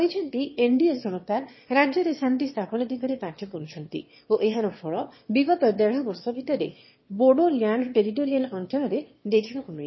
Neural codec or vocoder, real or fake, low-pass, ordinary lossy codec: autoencoder, 22.05 kHz, a latent of 192 numbers a frame, VITS, trained on one speaker; fake; 7.2 kHz; MP3, 24 kbps